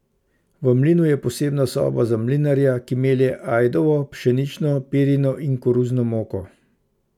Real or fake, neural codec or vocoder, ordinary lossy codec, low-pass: real; none; none; 19.8 kHz